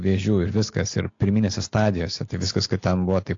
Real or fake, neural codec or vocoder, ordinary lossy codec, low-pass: real; none; AAC, 48 kbps; 7.2 kHz